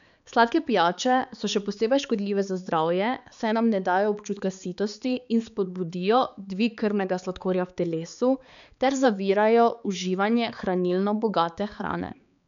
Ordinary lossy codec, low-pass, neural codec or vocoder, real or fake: none; 7.2 kHz; codec, 16 kHz, 4 kbps, X-Codec, HuBERT features, trained on balanced general audio; fake